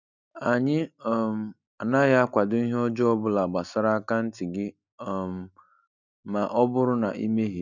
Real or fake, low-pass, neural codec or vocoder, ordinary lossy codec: real; 7.2 kHz; none; none